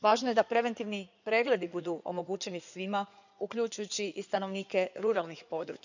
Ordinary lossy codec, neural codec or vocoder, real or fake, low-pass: none; codec, 16 kHz in and 24 kHz out, 2.2 kbps, FireRedTTS-2 codec; fake; 7.2 kHz